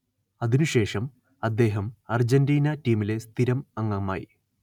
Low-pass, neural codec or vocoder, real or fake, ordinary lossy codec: 19.8 kHz; none; real; none